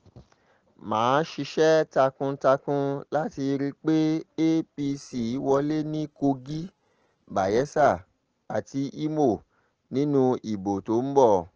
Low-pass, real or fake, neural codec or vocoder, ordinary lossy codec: 7.2 kHz; real; none; Opus, 16 kbps